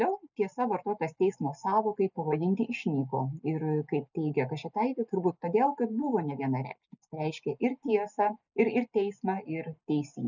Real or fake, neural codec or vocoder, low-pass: real; none; 7.2 kHz